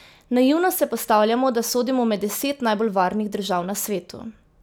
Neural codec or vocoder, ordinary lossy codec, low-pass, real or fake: none; none; none; real